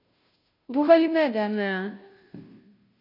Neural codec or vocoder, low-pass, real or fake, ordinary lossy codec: codec, 16 kHz, 0.5 kbps, FunCodec, trained on Chinese and English, 25 frames a second; 5.4 kHz; fake; AAC, 32 kbps